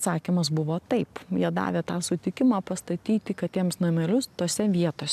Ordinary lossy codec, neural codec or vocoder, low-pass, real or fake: AAC, 96 kbps; none; 14.4 kHz; real